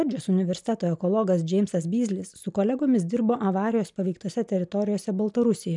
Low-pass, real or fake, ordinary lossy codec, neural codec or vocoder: 10.8 kHz; real; MP3, 96 kbps; none